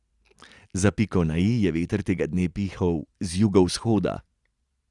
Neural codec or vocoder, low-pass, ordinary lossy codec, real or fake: none; 10.8 kHz; Opus, 64 kbps; real